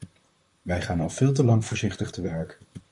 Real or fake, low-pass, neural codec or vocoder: fake; 10.8 kHz; vocoder, 44.1 kHz, 128 mel bands, Pupu-Vocoder